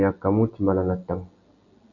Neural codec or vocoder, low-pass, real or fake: none; 7.2 kHz; real